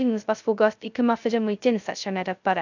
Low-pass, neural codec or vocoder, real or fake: 7.2 kHz; codec, 16 kHz, 0.2 kbps, FocalCodec; fake